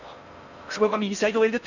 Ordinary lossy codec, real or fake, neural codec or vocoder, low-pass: none; fake; codec, 16 kHz in and 24 kHz out, 0.6 kbps, FocalCodec, streaming, 4096 codes; 7.2 kHz